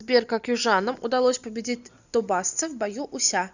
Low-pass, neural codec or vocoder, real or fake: 7.2 kHz; none; real